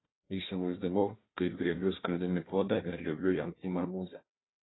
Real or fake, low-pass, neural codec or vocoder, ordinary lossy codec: fake; 7.2 kHz; codec, 16 kHz, 1 kbps, FunCodec, trained on Chinese and English, 50 frames a second; AAC, 16 kbps